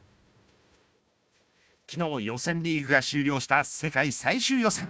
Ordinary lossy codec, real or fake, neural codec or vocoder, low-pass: none; fake; codec, 16 kHz, 1 kbps, FunCodec, trained on Chinese and English, 50 frames a second; none